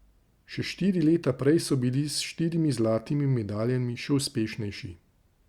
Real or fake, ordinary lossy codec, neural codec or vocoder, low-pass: real; Opus, 64 kbps; none; 19.8 kHz